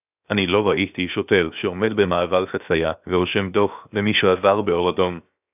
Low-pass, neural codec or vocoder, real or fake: 3.6 kHz; codec, 16 kHz, 0.7 kbps, FocalCodec; fake